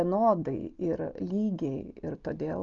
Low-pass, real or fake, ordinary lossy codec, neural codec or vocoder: 7.2 kHz; real; Opus, 32 kbps; none